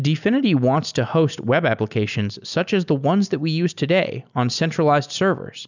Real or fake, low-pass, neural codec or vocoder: real; 7.2 kHz; none